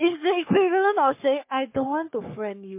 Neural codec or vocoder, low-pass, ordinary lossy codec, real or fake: codec, 44.1 kHz, 3.4 kbps, Pupu-Codec; 3.6 kHz; MP3, 24 kbps; fake